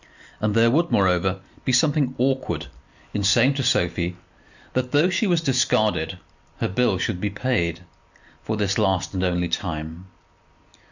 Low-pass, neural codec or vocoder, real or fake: 7.2 kHz; none; real